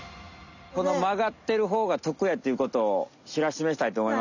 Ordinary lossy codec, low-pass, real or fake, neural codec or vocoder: none; 7.2 kHz; real; none